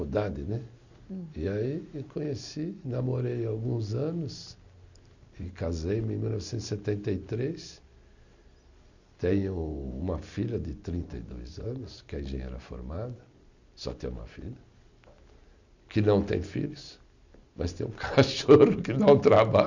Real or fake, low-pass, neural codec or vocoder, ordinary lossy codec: real; 7.2 kHz; none; none